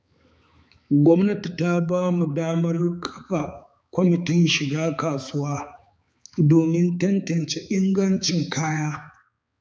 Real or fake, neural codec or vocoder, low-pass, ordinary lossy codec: fake; codec, 16 kHz, 4 kbps, X-Codec, HuBERT features, trained on balanced general audio; none; none